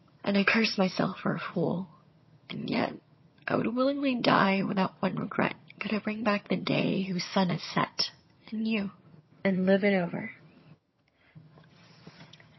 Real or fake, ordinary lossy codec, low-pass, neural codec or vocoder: fake; MP3, 24 kbps; 7.2 kHz; vocoder, 22.05 kHz, 80 mel bands, HiFi-GAN